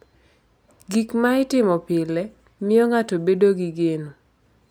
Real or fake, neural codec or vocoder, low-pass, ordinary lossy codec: real; none; none; none